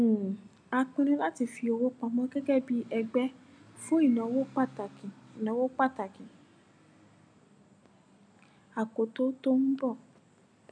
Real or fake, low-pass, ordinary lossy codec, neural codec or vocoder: real; 9.9 kHz; none; none